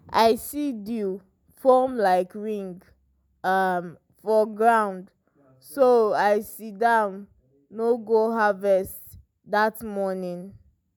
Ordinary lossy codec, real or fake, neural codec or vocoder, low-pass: none; real; none; none